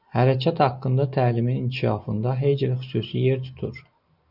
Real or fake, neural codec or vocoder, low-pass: real; none; 5.4 kHz